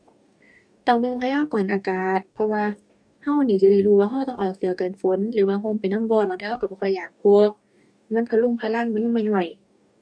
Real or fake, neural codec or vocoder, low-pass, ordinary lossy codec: fake; codec, 44.1 kHz, 2.6 kbps, DAC; 9.9 kHz; none